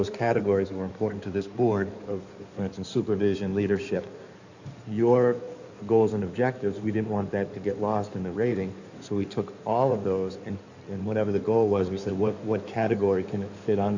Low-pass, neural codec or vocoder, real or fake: 7.2 kHz; codec, 16 kHz in and 24 kHz out, 2.2 kbps, FireRedTTS-2 codec; fake